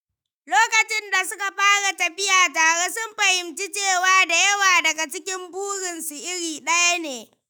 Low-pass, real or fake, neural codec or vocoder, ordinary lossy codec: none; fake; autoencoder, 48 kHz, 128 numbers a frame, DAC-VAE, trained on Japanese speech; none